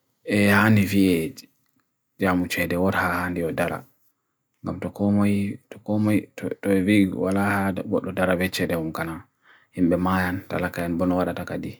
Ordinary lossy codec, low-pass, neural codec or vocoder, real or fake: none; none; none; real